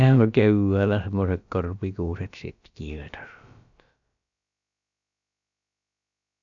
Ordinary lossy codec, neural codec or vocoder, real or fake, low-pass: MP3, 64 kbps; codec, 16 kHz, about 1 kbps, DyCAST, with the encoder's durations; fake; 7.2 kHz